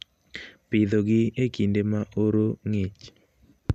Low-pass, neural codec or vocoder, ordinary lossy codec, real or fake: 14.4 kHz; none; none; real